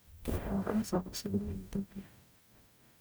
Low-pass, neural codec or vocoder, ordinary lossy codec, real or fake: none; codec, 44.1 kHz, 0.9 kbps, DAC; none; fake